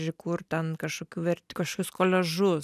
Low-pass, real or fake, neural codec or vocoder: 14.4 kHz; real; none